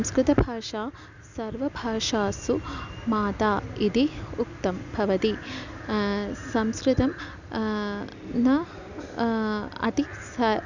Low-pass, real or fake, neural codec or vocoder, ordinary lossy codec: 7.2 kHz; real; none; none